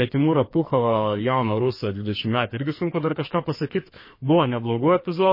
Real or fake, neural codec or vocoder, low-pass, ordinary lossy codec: fake; codec, 44.1 kHz, 2.6 kbps, SNAC; 5.4 kHz; MP3, 24 kbps